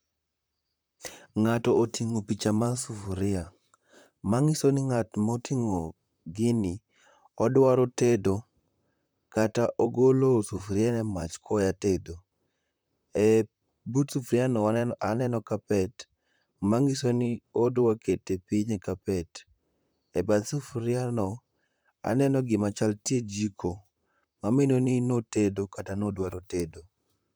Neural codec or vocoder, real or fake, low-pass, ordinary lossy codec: vocoder, 44.1 kHz, 128 mel bands, Pupu-Vocoder; fake; none; none